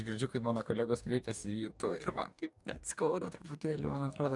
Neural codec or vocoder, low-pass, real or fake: codec, 44.1 kHz, 2.6 kbps, DAC; 10.8 kHz; fake